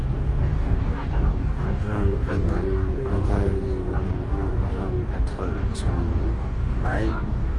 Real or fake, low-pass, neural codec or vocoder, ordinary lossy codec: fake; 10.8 kHz; codec, 44.1 kHz, 2.6 kbps, DAC; Opus, 32 kbps